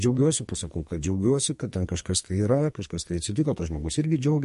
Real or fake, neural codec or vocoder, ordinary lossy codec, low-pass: fake; codec, 44.1 kHz, 2.6 kbps, SNAC; MP3, 48 kbps; 14.4 kHz